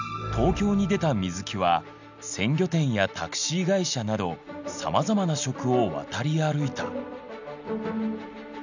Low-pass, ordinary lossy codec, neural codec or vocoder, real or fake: 7.2 kHz; none; none; real